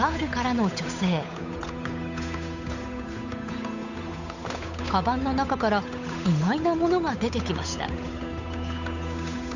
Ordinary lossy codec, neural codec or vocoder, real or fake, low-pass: none; codec, 16 kHz, 8 kbps, FunCodec, trained on Chinese and English, 25 frames a second; fake; 7.2 kHz